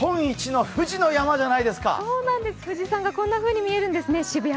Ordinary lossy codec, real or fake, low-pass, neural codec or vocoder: none; real; none; none